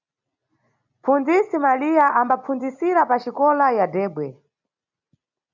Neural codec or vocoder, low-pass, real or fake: none; 7.2 kHz; real